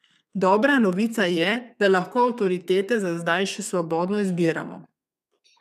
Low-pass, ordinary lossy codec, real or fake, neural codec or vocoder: 14.4 kHz; none; fake; codec, 32 kHz, 1.9 kbps, SNAC